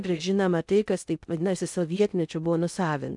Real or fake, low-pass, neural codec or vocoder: fake; 10.8 kHz; codec, 16 kHz in and 24 kHz out, 0.6 kbps, FocalCodec, streaming, 2048 codes